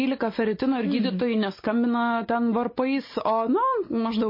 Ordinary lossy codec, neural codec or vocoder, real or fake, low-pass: MP3, 24 kbps; none; real; 5.4 kHz